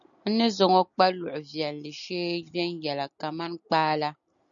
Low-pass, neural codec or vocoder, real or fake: 7.2 kHz; none; real